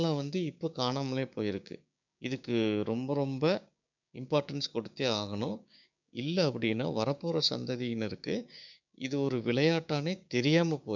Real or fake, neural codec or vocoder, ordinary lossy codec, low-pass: fake; codec, 16 kHz, 6 kbps, DAC; none; 7.2 kHz